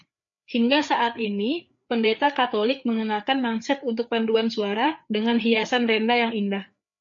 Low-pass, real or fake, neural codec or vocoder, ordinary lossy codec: 7.2 kHz; fake; codec, 16 kHz, 4 kbps, FreqCodec, larger model; MP3, 48 kbps